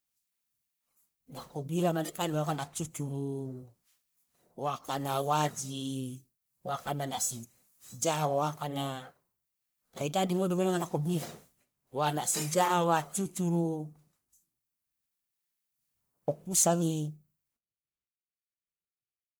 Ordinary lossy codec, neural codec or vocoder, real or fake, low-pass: none; codec, 44.1 kHz, 1.7 kbps, Pupu-Codec; fake; none